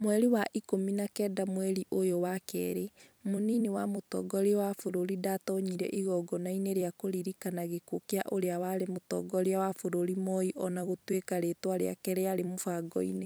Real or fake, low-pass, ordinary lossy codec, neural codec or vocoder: fake; none; none; vocoder, 44.1 kHz, 128 mel bands every 256 samples, BigVGAN v2